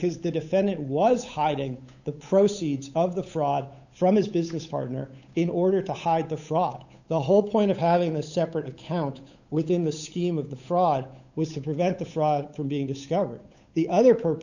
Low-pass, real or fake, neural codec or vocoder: 7.2 kHz; fake; codec, 16 kHz, 8 kbps, FunCodec, trained on Chinese and English, 25 frames a second